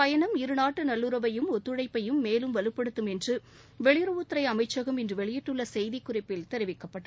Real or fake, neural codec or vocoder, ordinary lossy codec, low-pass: real; none; none; none